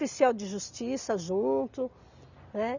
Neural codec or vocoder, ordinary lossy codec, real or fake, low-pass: none; none; real; 7.2 kHz